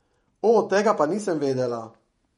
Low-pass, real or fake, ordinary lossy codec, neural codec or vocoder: 19.8 kHz; fake; MP3, 48 kbps; vocoder, 48 kHz, 128 mel bands, Vocos